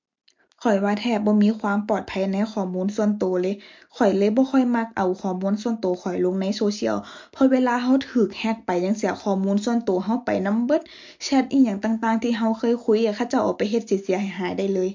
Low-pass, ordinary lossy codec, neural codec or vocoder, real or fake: 7.2 kHz; MP3, 48 kbps; none; real